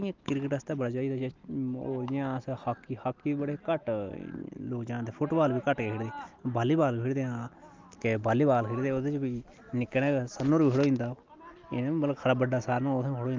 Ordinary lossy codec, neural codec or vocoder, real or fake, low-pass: Opus, 32 kbps; none; real; 7.2 kHz